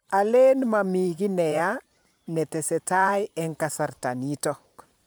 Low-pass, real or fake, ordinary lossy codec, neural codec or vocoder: none; fake; none; vocoder, 44.1 kHz, 128 mel bands every 512 samples, BigVGAN v2